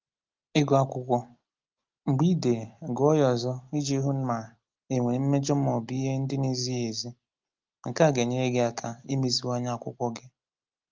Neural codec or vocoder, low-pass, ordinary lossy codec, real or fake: none; 7.2 kHz; Opus, 32 kbps; real